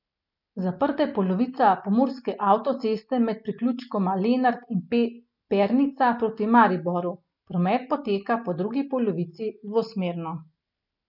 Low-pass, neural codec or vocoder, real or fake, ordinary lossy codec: 5.4 kHz; none; real; none